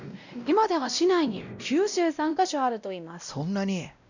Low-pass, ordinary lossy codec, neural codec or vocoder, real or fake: 7.2 kHz; none; codec, 16 kHz, 0.5 kbps, X-Codec, WavLM features, trained on Multilingual LibriSpeech; fake